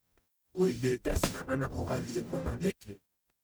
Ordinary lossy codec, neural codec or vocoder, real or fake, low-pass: none; codec, 44.1 kHz, 0.9 kbps, DAC; fake; none